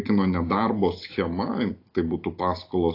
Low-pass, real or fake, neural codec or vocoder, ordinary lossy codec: 5.4 kHz; real; none; AAC, 32 kbps